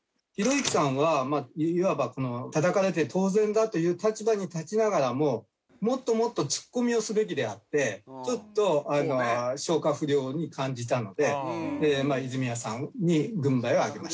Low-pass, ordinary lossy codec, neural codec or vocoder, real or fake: none; none; none; real